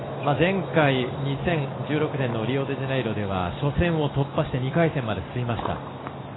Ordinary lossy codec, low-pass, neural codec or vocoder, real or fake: AAC, 16 kbps; 7.2 kHz; none; real